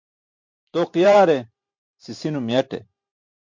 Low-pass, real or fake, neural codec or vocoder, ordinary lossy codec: 7.2 kHz; fake; vocoder, 44.1 kHz, 128 mel bands every 512 samples, BigVGAN v2; MP3, 48 kbps